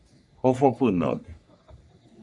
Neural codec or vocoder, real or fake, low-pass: codec, 44.1 kHz, 3.4 kbps, Pupu-Codec; fake; 10.8 kHz